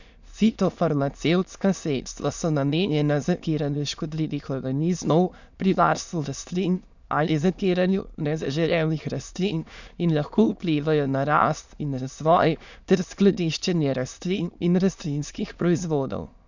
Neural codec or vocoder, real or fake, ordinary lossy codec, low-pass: autoencoder, 22.05 kHz, a latent of 192 numbers a frame, VITS, trained on many speakers; fake; none; 7.2 kHz